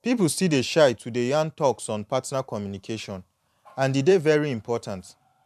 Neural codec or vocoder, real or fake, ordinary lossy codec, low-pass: vocoder, 44.1 kHz, 128 mel bands every 512 samples, BigVGAN v2; fake; none; 14.4 kHz